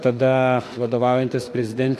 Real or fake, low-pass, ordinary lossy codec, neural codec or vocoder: fake; 14.4 kHz; AAC, 64 kbps; autoencoder, 48 kHz, 32 numbers a frame, DAC-VAE, trained on Japanese speech